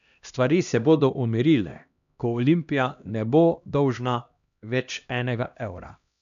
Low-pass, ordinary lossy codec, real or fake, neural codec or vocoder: 7.2 kHz; none; fake; codec, 16 kHz, 1 kbps, X-Codec, HuBERT features, trained on LibriSpeech